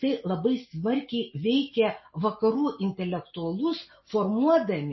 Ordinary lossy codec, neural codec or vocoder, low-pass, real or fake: MP3, 24 kbps; none; 7.2 kHz; real